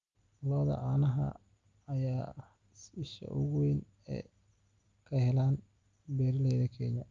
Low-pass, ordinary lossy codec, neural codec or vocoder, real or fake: 7.2 kHz; Opus, 32 kbps; none; real